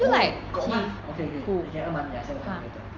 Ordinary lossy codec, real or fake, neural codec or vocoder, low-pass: Opus, 32 kbps; real; none; 7.2 kHz